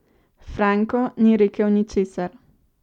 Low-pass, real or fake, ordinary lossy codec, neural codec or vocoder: 19.8 kHz; real; none; none